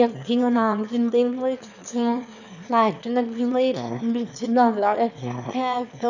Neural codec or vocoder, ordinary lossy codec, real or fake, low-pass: autoencoder, 22.05 kHz, a latent of 192 numbers a frame, VITS, trained on one speaker; none; fake; 7.2 kHz